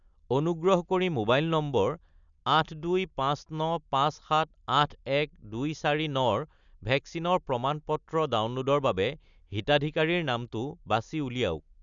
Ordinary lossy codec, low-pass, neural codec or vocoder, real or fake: none; 7.2 kHz; none; real